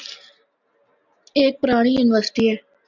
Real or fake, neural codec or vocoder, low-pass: real; none; 7.2 kHz